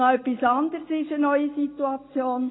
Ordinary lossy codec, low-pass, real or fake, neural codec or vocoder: AAC, 16 kbps; 7.2 kHz; real; none